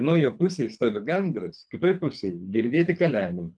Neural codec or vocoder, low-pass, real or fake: codec, 24 kHz, 3 kbps, HILCodec; 9.9 kHz; fake